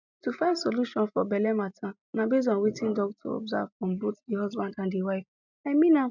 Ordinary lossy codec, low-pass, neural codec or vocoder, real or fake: none; 7.2 kHz; none; real